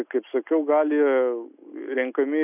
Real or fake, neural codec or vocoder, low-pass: real; none; 3.6 kHz